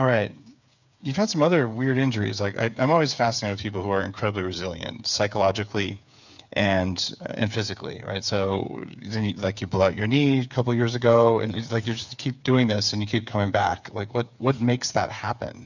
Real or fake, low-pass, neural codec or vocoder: fake; 7.2 kHz; codec, 16 kHz, 8 kbps, FreqCodec, smaller model